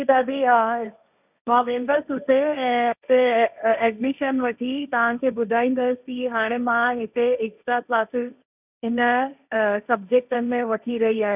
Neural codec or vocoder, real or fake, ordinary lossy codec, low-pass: codec, 16 kHz, 1.1 kbps, Voila-Tokenizer; fake; none; 3.6 kHz